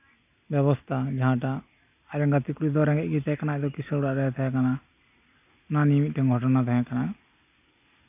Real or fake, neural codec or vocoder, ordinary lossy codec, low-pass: real; none; none; 3.6 kHz